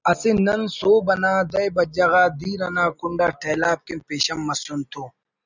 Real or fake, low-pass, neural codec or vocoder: real; 7.2 kHz; none